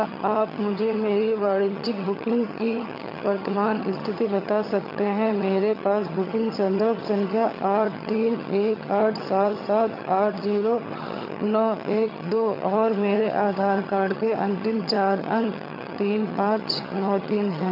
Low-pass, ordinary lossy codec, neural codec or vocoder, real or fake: 5.4 kHz; none; vocoder, 22.05 kHz, 80 mel bands, HiFi-GAN; fake